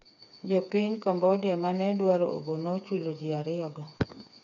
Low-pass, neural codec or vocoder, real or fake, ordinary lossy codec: 7.2 kHz; codec, 16 kHz, 4 kbps, FreqCodec, smaller model; fake; none